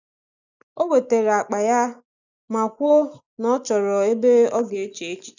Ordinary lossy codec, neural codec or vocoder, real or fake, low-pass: none; none; real; 7.2 kHz